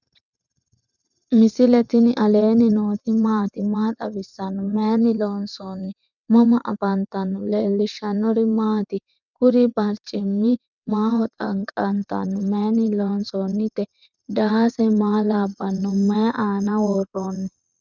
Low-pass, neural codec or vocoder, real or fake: 7.2 kHz; vocoder, 22.05 kHz, 80 mel bands, WaveNeXt; fake